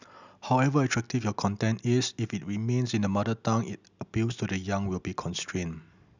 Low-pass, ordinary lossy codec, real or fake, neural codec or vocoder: 7.2 kHz; none; real; none